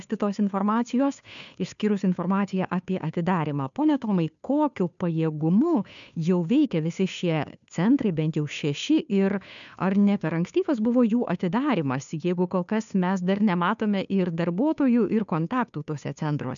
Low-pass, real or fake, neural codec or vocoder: 7.2 kHz; fake; codec, 16 kHz, 2 kbps, FunCodec, trained on Chinese and English, 25 frames a second